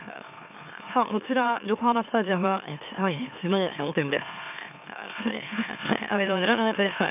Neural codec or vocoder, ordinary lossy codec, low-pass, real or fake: autoencoder, 44.1 kHz, a latent of 192 numbers a frame, MeloTTS; none; 3.6 kHz; fake